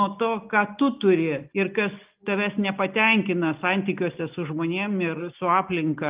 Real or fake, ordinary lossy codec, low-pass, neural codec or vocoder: real; Opus, 24 kbps; 3.6 kHz; none